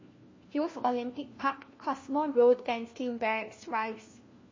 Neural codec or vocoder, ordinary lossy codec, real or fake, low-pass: codec, 16 kHz, 1 kbps, FunCodec, trained on LibriTTS, 50 frames a second; MP3, 32 kbps; fake; 7.2 kHz